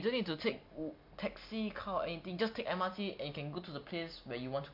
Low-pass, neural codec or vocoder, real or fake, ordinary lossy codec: 5.4 kHz; none; real; MP3, 48 kbps